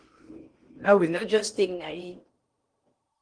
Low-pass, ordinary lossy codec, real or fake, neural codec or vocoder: 9.9 kHz; Opus, 24 kbps; fake; codec, 16 kHz in and 24 kHz out, 0.6 kbps, FocalCodec, streaming, 4096 codes